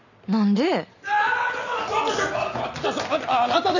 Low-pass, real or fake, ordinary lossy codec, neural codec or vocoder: 7.2 kHz; real; none; none